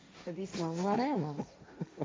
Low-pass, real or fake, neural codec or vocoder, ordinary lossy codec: none; fake; codec, 16 kHz, 1.1 kbps, Voila-Tokenizer; none